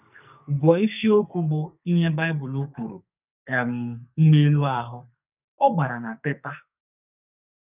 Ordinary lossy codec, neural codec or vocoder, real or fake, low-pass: none; codec, 44.1 kHz, 2.6 kbps, SNAC; fake; 3.6 kHz